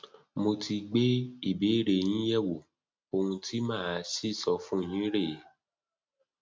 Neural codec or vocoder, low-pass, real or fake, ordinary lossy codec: none; none; real; none